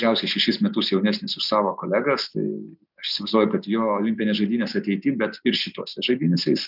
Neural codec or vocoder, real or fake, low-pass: none; real; 5.4 kHz